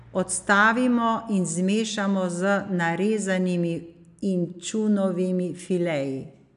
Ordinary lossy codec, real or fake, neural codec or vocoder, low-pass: none; real; none; 10.8 kHz